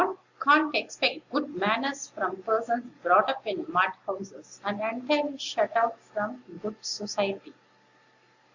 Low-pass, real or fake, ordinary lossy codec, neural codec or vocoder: 7.2 kHz; real; Opus, 64 kbps; none